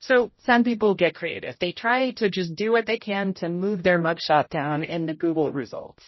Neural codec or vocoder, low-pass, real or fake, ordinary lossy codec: codec, 16 kHz, 0.5 kbps, X-Codec, HuBERT features, trained on general audio; 7.2 kHz; fake; MP3, 24 kbps